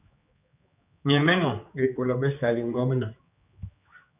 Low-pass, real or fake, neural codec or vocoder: 3.6 kHz; fake; codec, 16 kHz, 4 kbps, X-Codec, HuBERT features, trained on general audio